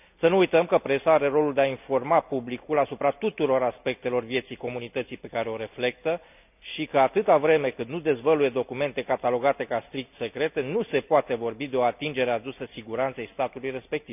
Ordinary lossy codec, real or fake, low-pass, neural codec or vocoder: none; real; 3.6 kHz; none